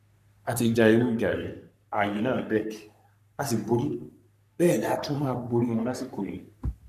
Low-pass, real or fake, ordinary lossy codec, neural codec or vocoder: 14.4 kHz; fake; none; codec, 44.1 kHz, 3.4 kbps, Pupu-Codec